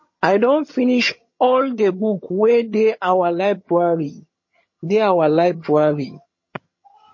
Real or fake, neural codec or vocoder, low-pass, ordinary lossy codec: fake; vocoder, 22.05 kHz, 80 mel bands, HiFi-GAN; 7.2 kHz; MP3, 32 kbps